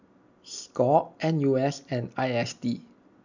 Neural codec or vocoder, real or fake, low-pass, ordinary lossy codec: none; real; 7.2 kHz; none